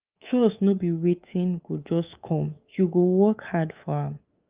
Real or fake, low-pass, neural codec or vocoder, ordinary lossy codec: fake; 3.6 kHz; codec, 24 kHz, 3.1 kbps, DualCodec; Opus, 24 kbps